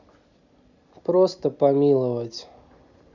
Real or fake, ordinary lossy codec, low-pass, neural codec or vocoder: real; none; 7.2 kHz; none